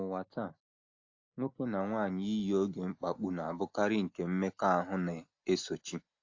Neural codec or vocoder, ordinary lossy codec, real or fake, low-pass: none; AAC, 48 kbps; real; 7.2 kHz